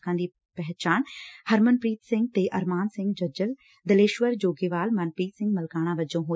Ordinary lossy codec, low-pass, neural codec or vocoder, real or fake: none; none; none; real